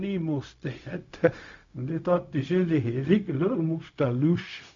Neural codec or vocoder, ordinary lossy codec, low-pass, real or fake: codec, 16 kHz, 0.4 kbps, LongCat-Audio-Codec; MP3, 64 kbps; 7.2 kHz; fake